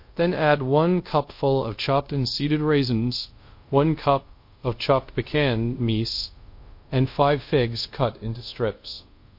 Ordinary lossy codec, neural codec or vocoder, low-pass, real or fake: MP3, 32 kbps; codec, 24 kHz, 0.5 kbps, DualCodec; 5.4 kHz; fake